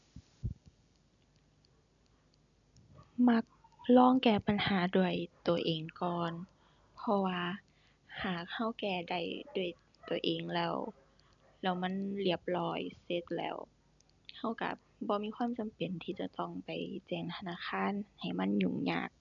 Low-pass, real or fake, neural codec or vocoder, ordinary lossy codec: 7.2 kHz; real; none; none